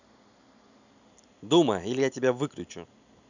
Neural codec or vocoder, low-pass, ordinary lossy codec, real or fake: none; 7.2 kHz; none; real